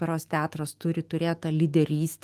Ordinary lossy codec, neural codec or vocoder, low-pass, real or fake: Opus, 24 kbps; autoencoder, 48 kHz, 128 numbers a frame, DAC-VAE, trained on Japanese speech; 14.4 kHz; fake